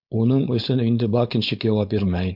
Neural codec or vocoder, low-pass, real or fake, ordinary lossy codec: codec, 16 kHz, 4.8 kbps, FACodec; 5.4 kHz; fake; MP3, 48 kbps